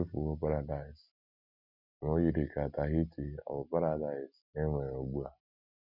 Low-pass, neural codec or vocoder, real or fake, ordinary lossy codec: 5.4 kHz; none; real; none